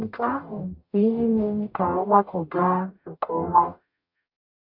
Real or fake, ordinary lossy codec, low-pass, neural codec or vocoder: fake; AAC, 48 kbps; 5.4 kHz; codec, 44.1 kHz, 0.9 kbps, DAC